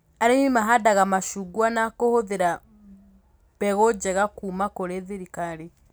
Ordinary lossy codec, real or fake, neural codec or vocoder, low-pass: none; real; none; none